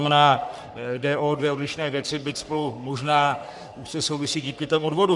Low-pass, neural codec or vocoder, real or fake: 10.8 kHz; codec, 44.1 kHz, 3.4 kbps, Pupu-Codec; fake